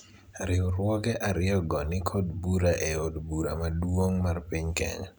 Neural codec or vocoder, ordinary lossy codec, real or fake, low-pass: none; none; real; none